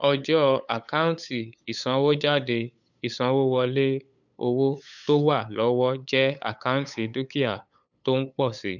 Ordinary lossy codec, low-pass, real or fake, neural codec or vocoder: none; 7.2 kHz; fake; codec, 16 kHz, 8 kbps, FunCodec, trained on LibriTTS, 25 frames a second